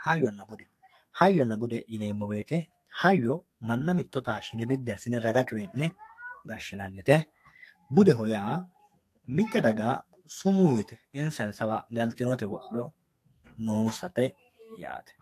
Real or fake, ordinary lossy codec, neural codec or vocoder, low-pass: fake; AAC, 64 kbps; codec, 32 kHz, 1.9 kbps, SNAC; 14.4 kHz